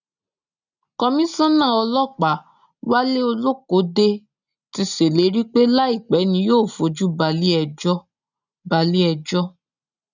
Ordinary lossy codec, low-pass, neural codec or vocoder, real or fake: none; 7.2 kHz; none; real